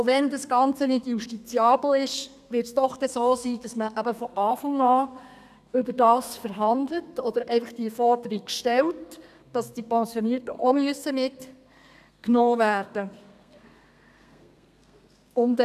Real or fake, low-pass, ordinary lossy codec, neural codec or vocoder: fake; 14.4 kHz; none; codec, 32 kHz, 1.9 kbps, SNAC